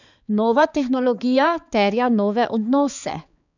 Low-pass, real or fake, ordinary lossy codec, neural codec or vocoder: 7.2 kHz; fake; none; codec, 16 kHz, 4 kbps, X-Codec, HuBERT features, trained on balanced general audio